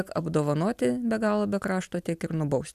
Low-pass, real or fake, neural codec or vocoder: 14.4 kHz; real; none